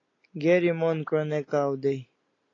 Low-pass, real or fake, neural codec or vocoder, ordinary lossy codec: 7.2 kHz; real; none; AAC, 32 kbps